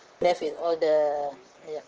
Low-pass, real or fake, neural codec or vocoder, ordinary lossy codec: 7.2 kHz; fake; vocoder, 44.1 kHz, 128 mel bands, Pupu-Vocoder; Opus, 16 kbps